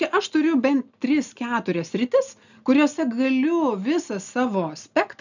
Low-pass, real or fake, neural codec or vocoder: 7.2 kHz; real; none